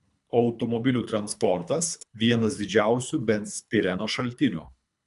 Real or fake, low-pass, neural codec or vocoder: fake; 10.8 kHz; codec, 24 kHz, 3 kbps, HILCodec